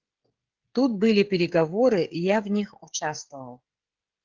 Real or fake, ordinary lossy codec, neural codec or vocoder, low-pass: fake; Opus, 16 kbps; codec, 16 kHz, 16 kbps, FreqCodec, smaller model; 7.2 kHz